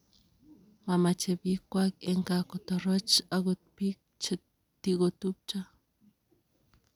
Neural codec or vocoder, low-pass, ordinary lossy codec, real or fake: none; 19.8 kHz; none; real